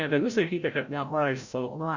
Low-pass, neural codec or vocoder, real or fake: 7.2 kHz; codec, 16 kHz, 0.5 kbps, FreqCodec, larger model; fake